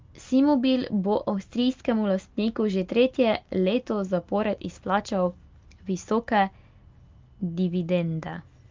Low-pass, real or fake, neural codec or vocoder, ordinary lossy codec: 7.2 kHz; real; none; Opus, 24 kbps